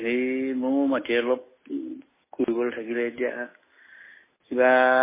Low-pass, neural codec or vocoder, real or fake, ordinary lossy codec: 3.6 kHz; none; real; MP3, 16 kbps